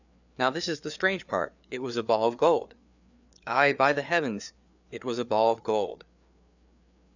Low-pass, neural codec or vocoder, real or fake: 7.2 kHz; codec, 16 kHz, 4 kbps, FreqCodec, larger model; fake